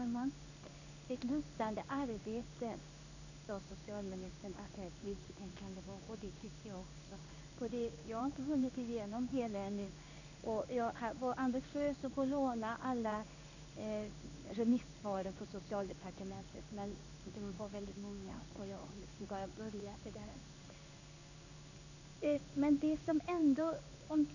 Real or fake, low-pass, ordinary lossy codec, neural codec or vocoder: fake; 7.2 kHz; none; codec, 16 kHz in and 24 kHz out, 1 kbps, XY-Tokenizer